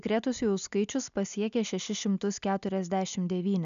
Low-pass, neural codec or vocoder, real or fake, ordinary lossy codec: 7.2 kHz; none; real; MP3, 96 kbps